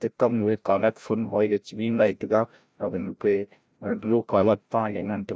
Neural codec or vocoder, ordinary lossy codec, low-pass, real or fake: codec, 16 kHz, 0.5 kbps, FreqCodec, larger model; none; none; fake